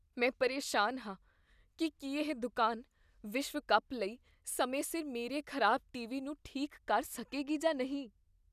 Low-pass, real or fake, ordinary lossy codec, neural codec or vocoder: 14.4 kHz; real; none; none